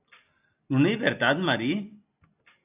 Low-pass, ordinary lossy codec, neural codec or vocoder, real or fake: 3.6 kHz; AAC, 24 kbps; none; real